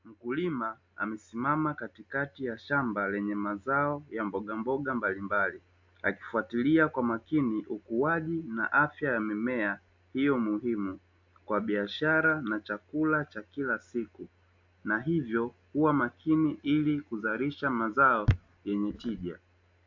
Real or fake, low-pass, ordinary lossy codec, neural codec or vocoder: real; 7.2 kHz; MP3, 64 kbps; none